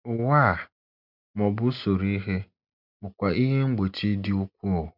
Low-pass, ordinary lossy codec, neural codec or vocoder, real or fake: 5.4 kHz; none; none; real